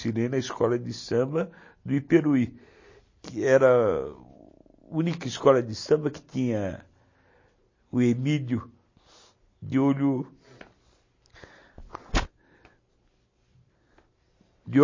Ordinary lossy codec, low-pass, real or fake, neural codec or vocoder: MP3, 32 kbps; 7.2 kHz; real; none